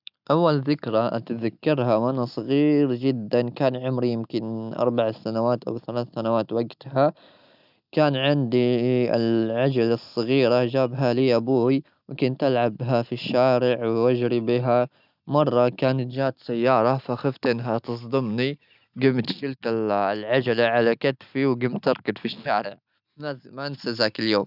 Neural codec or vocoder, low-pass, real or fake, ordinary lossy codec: none; 5.4 kHz; real; none